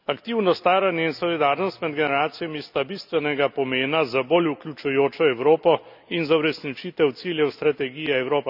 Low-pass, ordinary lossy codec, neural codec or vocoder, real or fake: 5.4 kHz; none; none; real